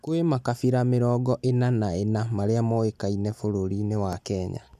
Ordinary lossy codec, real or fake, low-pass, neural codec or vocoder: none; real; 14.4 kHz; none